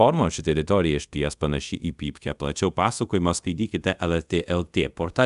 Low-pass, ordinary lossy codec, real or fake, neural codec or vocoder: 10.8 kHz; MP3, 96 kbps; fake; codec, 24 kHz, 0.5 kbps, DualCodec